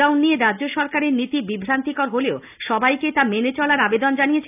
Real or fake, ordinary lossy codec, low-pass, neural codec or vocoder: real; none; 3.6 kHz; none